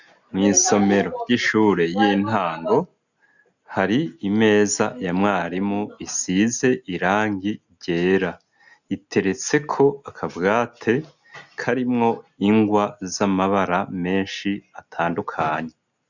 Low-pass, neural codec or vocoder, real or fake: 7.2 kHz; none; real